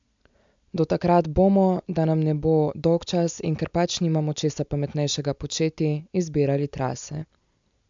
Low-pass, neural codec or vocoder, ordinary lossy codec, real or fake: 7.2 kHz; none; MP3, 64 kbps; real